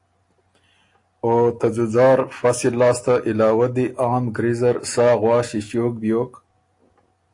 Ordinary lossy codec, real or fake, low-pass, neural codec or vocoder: AAC, 48 kbps; real; 10.8 kHz; none